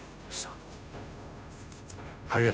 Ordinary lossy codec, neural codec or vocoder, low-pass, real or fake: none; codec, 16 kHz, 0.5 kbps, FunCodec, trained on Chinese and English, 25 frames a second; none; fake